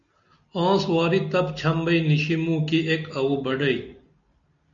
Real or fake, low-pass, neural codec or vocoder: real; 7.2 kHz; none